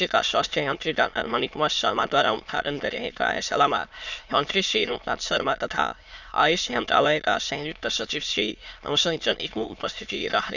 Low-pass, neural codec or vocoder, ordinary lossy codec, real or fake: 7.2 kHz; autoencoder, 22.05 kHz, a latent of 192 numbers a frame, VITS, trained on many speakers; none; fake